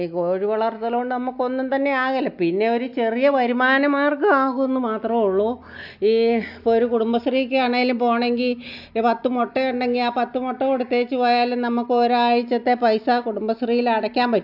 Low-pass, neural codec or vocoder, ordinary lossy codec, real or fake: 5.4 kHz; none; AAC, 48 kbps; real